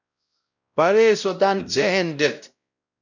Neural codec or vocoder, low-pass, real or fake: codec, 16 kHz, 0.5 kbps, X-Codec, WavLM features, trained on Multilingual LibriSpeech; 7.2 kHz; fake